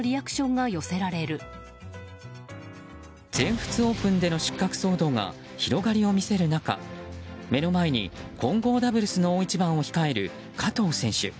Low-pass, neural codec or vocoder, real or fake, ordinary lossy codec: none; none; real; none